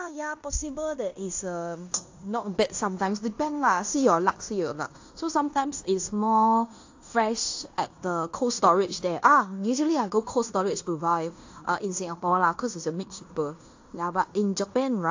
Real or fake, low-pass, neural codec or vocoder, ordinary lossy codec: fake; 7.2 kHz; codec, 16 kHz in and 24 kHz out, 0.9 kbps, LongCat-Audio-Codec, fine tuned four codebook decoder; AAC, 48 kbps